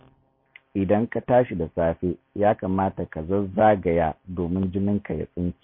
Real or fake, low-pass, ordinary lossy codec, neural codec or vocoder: real; 5.4 kHz; MP3, 24 kbps; none